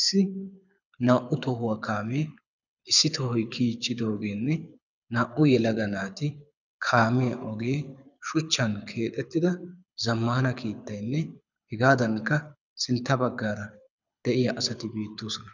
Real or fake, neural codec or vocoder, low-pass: fake; codec, 24 kHz, 6 kbps, HILCodec; 7.2 kHz